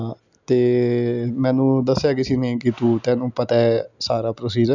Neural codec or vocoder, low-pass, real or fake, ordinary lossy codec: none; 7.2 kHz; real; none